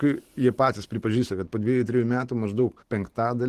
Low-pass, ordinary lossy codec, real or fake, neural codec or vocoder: 14.4 kHz; Opus, 16 kbps; fake; vocoder, 44.1 kHz, 128 mel bands every 512 samples, BigVGAN v2